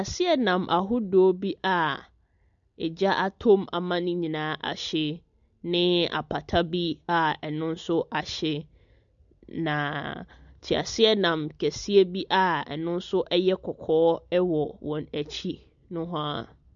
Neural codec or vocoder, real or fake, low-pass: none; real; 7.2 kHz